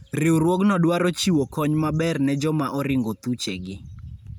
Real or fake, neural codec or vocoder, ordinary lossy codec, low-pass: real; none; none; none